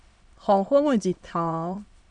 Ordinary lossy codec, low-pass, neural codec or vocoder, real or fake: MP3, 96 kbps; 9.9 kHz; autoencoder, 22.05 kHz, a latent of 192 numbers a frame, VITS, trained on many speakers; fake